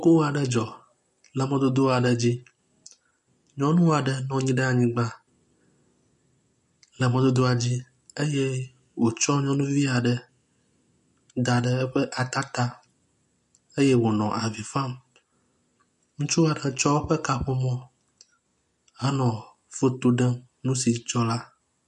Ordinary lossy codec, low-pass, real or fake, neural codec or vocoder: MP3, 48 kbps; 10.8 kHz; real; none